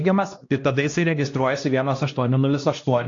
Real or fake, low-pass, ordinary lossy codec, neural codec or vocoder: fake; 7.2 kHz; AAC, 48 kbps; codec, 16 kHz, 1 kbps, X-Codec, HuBERT features, trained on LibriSpeech